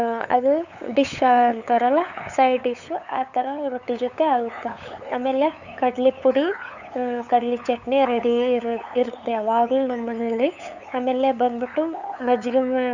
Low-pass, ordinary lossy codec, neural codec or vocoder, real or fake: 7.2 kHz; none; codec, 16 kHz, 8 kbps, FunCodec, trained on LibriTTS, 25 frames a second; fake